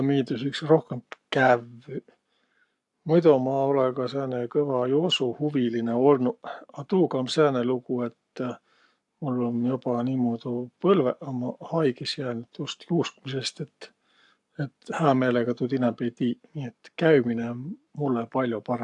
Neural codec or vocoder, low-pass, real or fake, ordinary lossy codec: codec, 44.1 kHz, 7.8 kbps, Pupu-Codec; 10.8 kHz; fake; AAC, 64 kbps